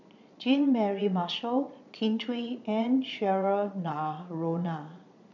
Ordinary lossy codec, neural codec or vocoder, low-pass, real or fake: none; vocoder, 22.05 kHz, 80 mel bands, Vocos; 7.2 kHz; fake